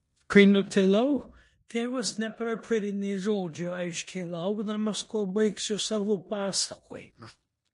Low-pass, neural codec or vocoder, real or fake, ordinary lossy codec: 10.8 kHz; codec, 16 kHz in and 24 kHz out, 0.9 kbps, LongCat-Audio-Codec, four codebook decoder; fake; MP3, 48 kbps